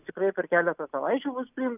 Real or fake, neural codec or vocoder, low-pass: real; none; 3.6 kHz